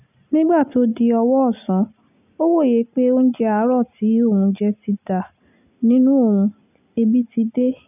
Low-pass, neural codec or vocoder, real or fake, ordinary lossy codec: 3.6 kHz; none; real; none